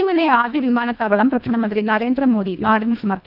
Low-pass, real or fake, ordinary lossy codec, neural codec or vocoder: 5.4 kHz; fake; none; codec, 24 kHz, 1.5 kbps, HILCodec